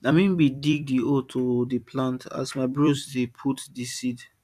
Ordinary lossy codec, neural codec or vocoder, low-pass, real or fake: AAC, 96 kbps; vocoder, 44.1 kHz, 128 mel bands every 256 samples, BigVGAN v2; 14.4 kHz; fake